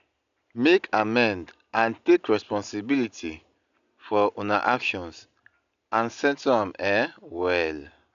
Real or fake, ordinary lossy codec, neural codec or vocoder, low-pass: real; none; none; 7.2 kHz